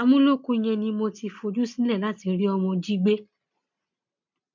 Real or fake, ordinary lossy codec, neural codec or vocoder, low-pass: real; MP3, 64 kbps; none; 7.2 kHz